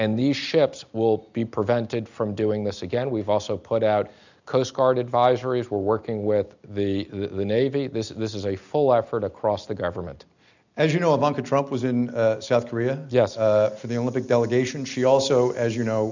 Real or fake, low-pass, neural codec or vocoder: real; 7.2 kHz; none